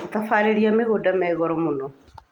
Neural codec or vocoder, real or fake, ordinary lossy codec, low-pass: none; real; Opus, 32 kbps; 19.8 kHz